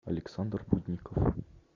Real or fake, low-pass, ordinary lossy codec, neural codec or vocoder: real; 7.2 kHz; AAC, 32 kbps; none